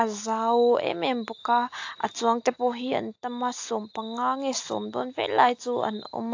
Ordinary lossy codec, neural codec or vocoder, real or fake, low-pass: AAC, 48 kbps; none; real; 7.2 kHz